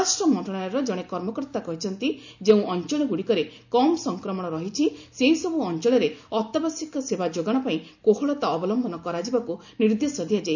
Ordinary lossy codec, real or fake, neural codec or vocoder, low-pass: none; real; none; 7.2 kHz